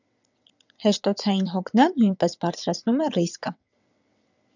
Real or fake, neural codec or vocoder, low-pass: fake; vocoder, 22.05 kHz, 80 mel bands, WaveNeXt; 7.2 kHz